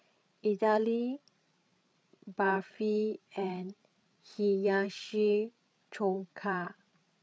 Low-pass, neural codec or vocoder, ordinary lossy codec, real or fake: none; codec, 16 kHz, 8 kbps, FreqCodec, larger model; none; fake